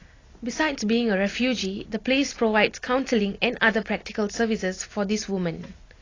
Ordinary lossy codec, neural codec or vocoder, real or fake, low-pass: AAC, 32 kbps; none; real; 7.2 kHz